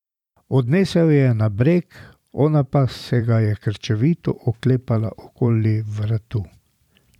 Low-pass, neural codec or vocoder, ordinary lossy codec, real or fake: 19.8 kHz; vocoder, 44.1 kHz, 128 mel bands every 512 samples, BigVGAN v2; none; fake